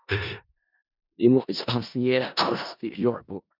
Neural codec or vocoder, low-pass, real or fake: codec, 16 kHz in and 24 kHz out, 0.4 kbps, LongCat-Audio-Codec, four codebook decoder; 5.4 kHz; fake